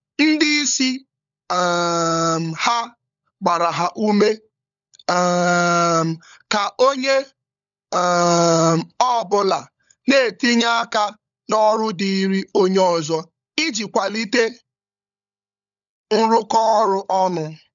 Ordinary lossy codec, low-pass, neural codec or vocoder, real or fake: none; 7.2 kHz; codec, 16 kHz, 16 kbps, FunCodec, trained on LibriTTS, 50 frames a second; fake